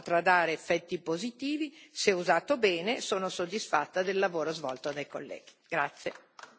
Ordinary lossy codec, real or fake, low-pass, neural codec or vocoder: none; real; none; none